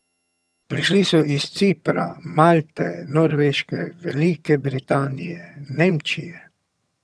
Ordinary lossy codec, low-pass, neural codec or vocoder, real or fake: none; none; vocoder, 22.05 kHz, 80 mel bands, HiFi-GAN; fake